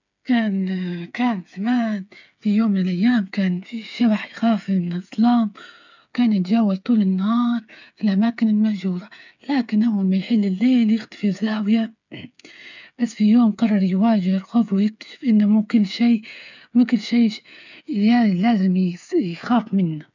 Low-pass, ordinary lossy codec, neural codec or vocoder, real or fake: 7.2 kHz; none; codec, 16 kHz, 8 kbps, FreqCodec, smaller model; fake